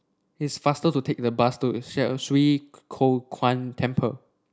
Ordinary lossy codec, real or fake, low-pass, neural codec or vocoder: none; real; none; none